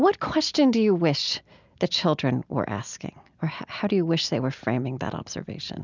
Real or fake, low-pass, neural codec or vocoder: real; 7.2 kHz; none